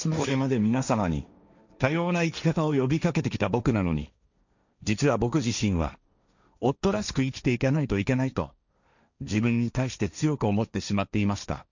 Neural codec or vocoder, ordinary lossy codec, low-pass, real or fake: codec, 16 kHz, 1.1 kbps, Voila-Tokenizer; none; 7.2 kHz; fake